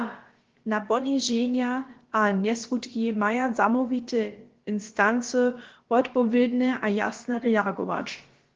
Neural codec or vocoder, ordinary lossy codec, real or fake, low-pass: codec, 16 kHz, about 1 kbps, DyCAST, with the encoder's durations; Opus, 16 kbps; fake; 7.2 kHz